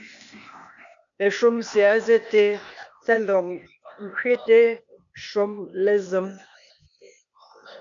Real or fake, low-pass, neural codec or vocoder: fake; 7.2 kHz; codec, 16 kHz, 0.8 kbps, ZipCodec